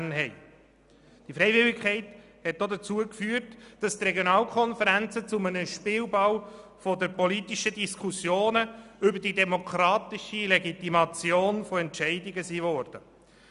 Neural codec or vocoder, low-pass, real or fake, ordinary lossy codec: none; 10.8 kHz; real; none